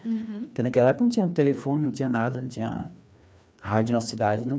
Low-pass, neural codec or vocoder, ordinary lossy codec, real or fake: none; codec, 16 kHz, 2 kbps, FreqCodec, larger model; none; fake